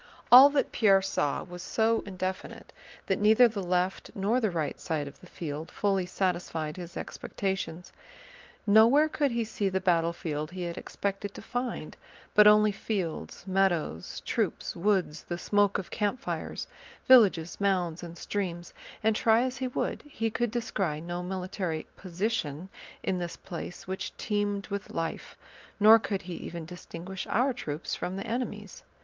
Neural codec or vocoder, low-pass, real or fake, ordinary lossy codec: none; 7.2 kHz; real; Opus, 24 kbps